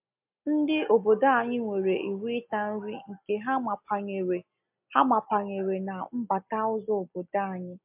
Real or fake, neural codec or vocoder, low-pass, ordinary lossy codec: real; none; 3.6 kHz; MP3, 32 kbps